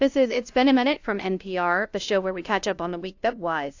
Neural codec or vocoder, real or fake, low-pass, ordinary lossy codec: codec, 16 kHz, 0.5 kbps, FunCodec, trained on LibriTTS, 25 frames a second; fake; 7.2 kHz; AAC, 48 kbps